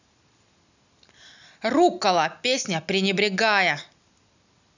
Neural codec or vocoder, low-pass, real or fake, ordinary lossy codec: none; 7.2 kHz; real; none